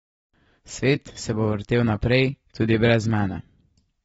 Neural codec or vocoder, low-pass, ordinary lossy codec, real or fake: vocoder, 44.1 kHz, 128 mel bands every 512 samples, BigVGAN v2; 19.8 kHz; AAC, 24 kbps; fake